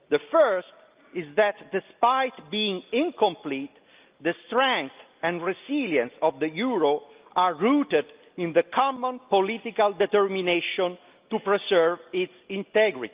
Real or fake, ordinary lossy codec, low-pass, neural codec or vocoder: real; Opus, 24 kbps; 3.6 kHz; none